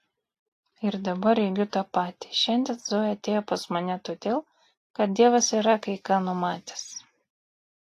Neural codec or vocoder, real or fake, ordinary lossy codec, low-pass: none; real; AAC, 48 kbps; 14.4 kHz